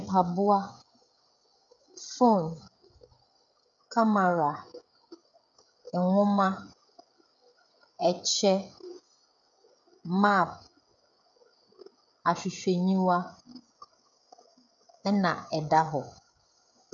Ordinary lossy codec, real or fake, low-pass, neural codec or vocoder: MP3, 64 kbps; fake; 7.2 kHz; codec, 16 kHz, 16 kbps, FreqCodec, smaller model